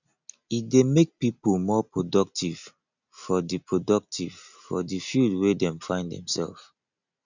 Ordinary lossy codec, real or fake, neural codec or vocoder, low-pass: none; real; none; 7.2 kHz